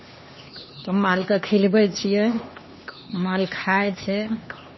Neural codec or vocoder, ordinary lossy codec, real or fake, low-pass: codec, 16 kHz, 2 kbps, X-Codec, HuBERT features, trained on LibriSpeech; MP3, 24 kbps; fake; 7.2 kHz